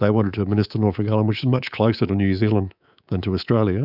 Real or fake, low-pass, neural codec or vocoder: fake; 5.4 kHz; codec, 24 kHz, 3.1 kbps, DualCodec